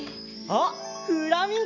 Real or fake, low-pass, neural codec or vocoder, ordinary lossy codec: real; 7.2 kHz; none; none